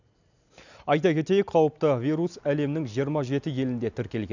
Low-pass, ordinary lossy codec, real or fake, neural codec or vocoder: 7.2 kHz; none; real; none